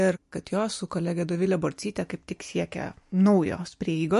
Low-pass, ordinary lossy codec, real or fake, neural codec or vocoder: 14.4 kHz; MP3, 48 kbps; real; none